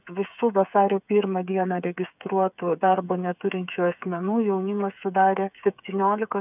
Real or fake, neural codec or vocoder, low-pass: fake; codec, 16 kHz, 8 kbps, FreqCodec, smaller model; 3.6 kHz